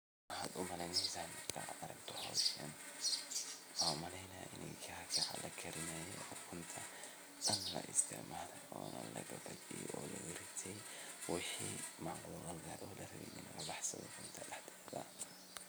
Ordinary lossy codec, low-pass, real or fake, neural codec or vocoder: none; none; real; none